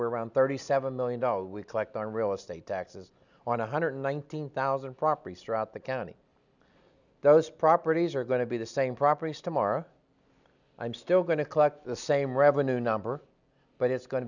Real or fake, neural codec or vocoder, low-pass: real; none; 7.2 kHz